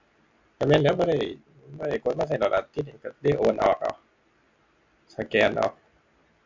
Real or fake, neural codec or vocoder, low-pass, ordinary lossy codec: real; none; 7.2 kHz; MP3, 64 kbps